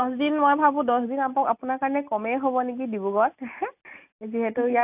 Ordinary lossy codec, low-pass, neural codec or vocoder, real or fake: AAC, 32 kbps; 3.6 kHz; none; real